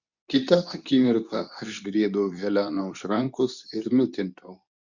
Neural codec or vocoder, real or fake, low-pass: codec, 24 kHz, 0.9 kbps, WavTokenizer, medium speech release version 2; fake; 7.2 kHz